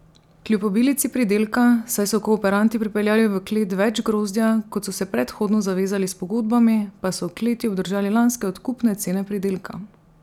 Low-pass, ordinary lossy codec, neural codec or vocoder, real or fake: 19.8 kHz; none; none; real